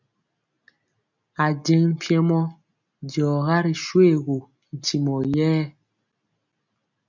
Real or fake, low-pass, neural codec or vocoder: real; 7.2 kHz; none